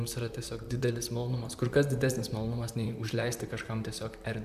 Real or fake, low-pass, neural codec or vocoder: fake; 14.4 kHz; vocoder, 44.1 kHz, 128 mel bands, Pupu-Vocoder